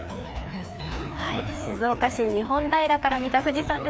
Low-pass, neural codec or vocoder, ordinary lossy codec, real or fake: none; codec, 16 kHz, 2 kbps, FreqCodec, larger model; none; fake